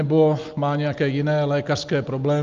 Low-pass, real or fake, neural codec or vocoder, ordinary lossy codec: 7.2 kHz; real; none; Opus, 24 kbps